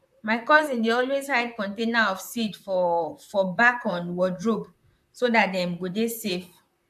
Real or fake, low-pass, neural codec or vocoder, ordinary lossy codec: fake; 14.4 kHz; vocoder, 44.1 kHz, 128 mel bands, Pupu-Vocoder; AAC, 96 kbps